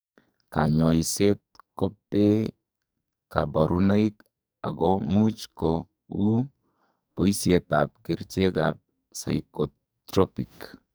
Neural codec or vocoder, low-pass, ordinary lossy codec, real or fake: codec, 44.1 kHz, 2.6 kbps, SNAC; none; none; fake